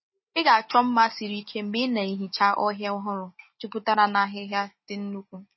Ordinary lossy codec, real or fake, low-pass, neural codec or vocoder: MP3, 24 kbps; real; 7.2 kHz; none